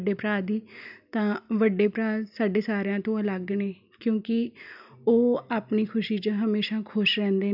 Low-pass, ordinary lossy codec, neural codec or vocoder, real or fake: 5.4 kHz; none; none; real